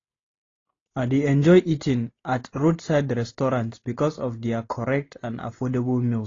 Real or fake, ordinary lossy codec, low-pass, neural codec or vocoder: real; AAC, 32 kbps; 7.2 kHz; none